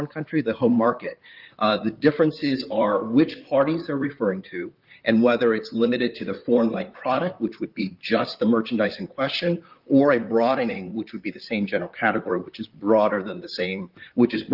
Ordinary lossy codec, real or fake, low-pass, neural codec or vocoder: Opus, 32 kbps; fake; 5.4 kHz; vocoder, 44.1 kHz, 128 mel bands, Pupu-Vocoder